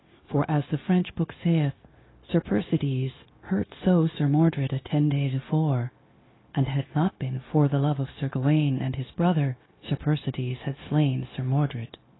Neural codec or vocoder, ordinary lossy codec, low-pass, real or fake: codec, 16 kHz, 0.9 kbps, LongCat-Audio-Codec; AAC, 16 kbps; 7.2 kHz; fake